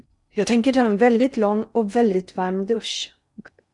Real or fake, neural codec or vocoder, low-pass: fake; codec, 16 kHz in and 24 kHz out, 0.6 kbps, FocalCodec, streaming, 4096 codes; 10.8 kHz